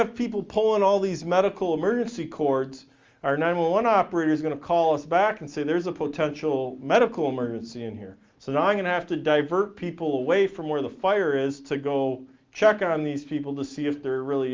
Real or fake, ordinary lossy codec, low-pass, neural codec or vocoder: real; Opus, 32 kbps; 7.2 kHz; none